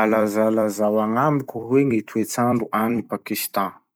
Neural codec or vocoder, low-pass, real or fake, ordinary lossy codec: vocoder, 44.1 kHz, 128 mel bands every 256 samples, BigVGAN v2; none; fake; none